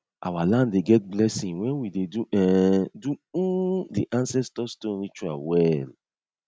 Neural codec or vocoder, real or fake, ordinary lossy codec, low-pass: none; real; none; none